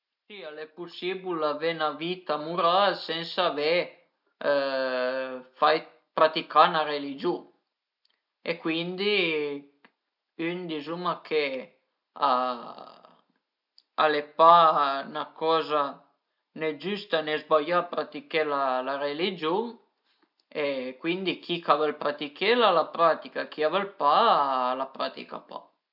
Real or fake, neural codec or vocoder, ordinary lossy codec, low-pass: real; none; none; 5.4 kHz